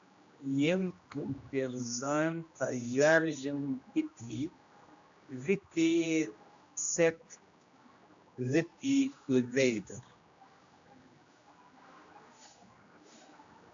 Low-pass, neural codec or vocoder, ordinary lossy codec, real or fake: 7.2 kHz; codec, 16 kHz, 1 kbps, X-Codec, HuBERT features, trained on general audio; AAC, 64 kbps; fake